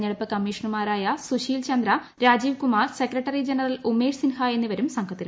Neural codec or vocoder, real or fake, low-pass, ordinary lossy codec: none; real; none; none